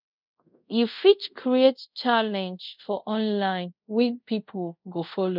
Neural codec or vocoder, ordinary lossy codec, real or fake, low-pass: codec, 24 kHz, 0.5 kbps, DualCodec; none; fake; 5.4 kHz